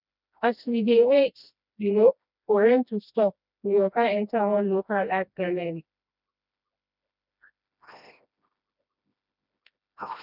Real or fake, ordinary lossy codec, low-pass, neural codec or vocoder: fake; none; 5.4 kHz; codec, 16 kHz, 1 kbps, FreqCodec, smaller model